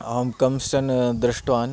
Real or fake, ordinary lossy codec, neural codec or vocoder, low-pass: real; none; none; none